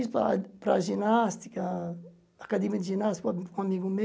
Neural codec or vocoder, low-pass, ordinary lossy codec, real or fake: none; none; none; real